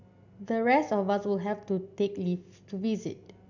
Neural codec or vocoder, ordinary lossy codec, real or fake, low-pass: none; none; real; 7.2 kHz